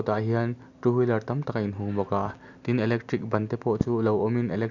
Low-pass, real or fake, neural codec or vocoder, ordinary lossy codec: 7.2 kHz; real; none; none